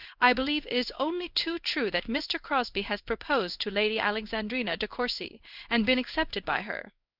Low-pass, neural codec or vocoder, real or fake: 5.4 kHz; codec, 16 kHz in and 24 kHz out, 1 kbps, XY-Tokenizer; fake